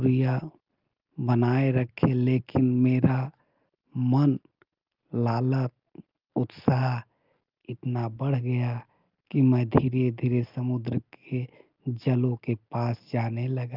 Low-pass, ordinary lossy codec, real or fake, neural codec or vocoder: 5.4 kHz; Opus, 32 kbps; real; none